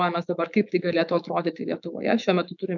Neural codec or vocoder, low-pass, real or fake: autoencoder, 48 kHz, 128 numbers a frame, DAC-VAE, trained on Japanese speech; 7.2 kHz; fake